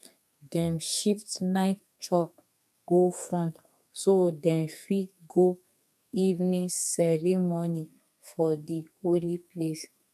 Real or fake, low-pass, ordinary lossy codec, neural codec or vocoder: fake; 14.4 kHz; none; codec, 32 kHz, 1.9 kbps, SNAC